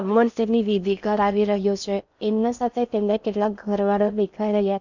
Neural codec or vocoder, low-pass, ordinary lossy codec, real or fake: codec, 16 kHz in and 24 kHz out, 0.6 kbps, FocalCodec, streaming, 4096 codes; 7.2 kHz; none; fake